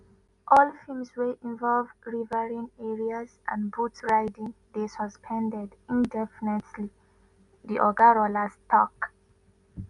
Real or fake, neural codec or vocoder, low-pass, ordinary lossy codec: real; none; 10.8 kHz; none